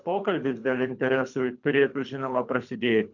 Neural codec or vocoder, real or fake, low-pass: codec, 24 kHz, 3 kbps, HILCodec; fake; 7.2 kHz